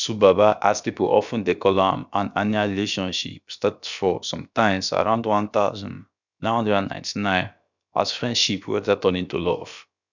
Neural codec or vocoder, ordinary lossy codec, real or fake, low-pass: codec, 16 kHz, about 1 kbps, DyCAST, with the encoder's durations; none; fake; 7.2 kHz